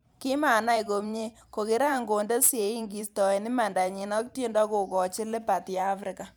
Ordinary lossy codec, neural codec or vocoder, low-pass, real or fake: none; vocoder, 44.1 kHz, 128 mel bands every 512 samples, BigVGAN v2; none; fake